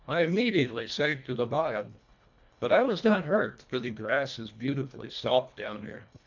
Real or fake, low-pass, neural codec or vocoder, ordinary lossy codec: fake; 7.2 kHz; codec, 24 kHz, 1.5 kbps, HILCodec; MP3, 64 kbps